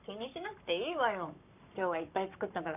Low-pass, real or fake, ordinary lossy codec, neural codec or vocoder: 3.6 kHz; fake; none; codec, 44.1 kHz, 7.8 kbps, Pupu-Codec